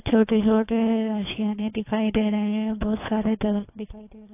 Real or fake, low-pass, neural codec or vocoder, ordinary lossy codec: fake; 3.6 kHz; codec, 24 kHz, 3 kbps, HILCodec; AAC, 24 kbps